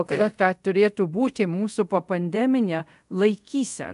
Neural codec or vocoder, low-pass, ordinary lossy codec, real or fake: codec, 24 kHz, 0.5 kbps, DualCodec; 10.8 kHz; AAC, 96 kbps; fake